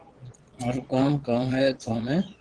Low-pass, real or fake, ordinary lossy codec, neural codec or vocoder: 9.9 kHz; fake; Opus, 16 kbps; vocoder, 22.05 kHz, 80 mel bands, Vocos